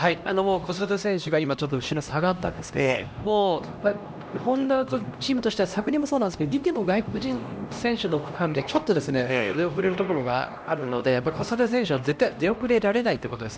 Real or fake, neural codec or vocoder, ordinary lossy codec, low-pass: fake; codec, 16 kHz, 1 kbps, X-Codec, HuBERT features, trained on LibriSpeech; none; none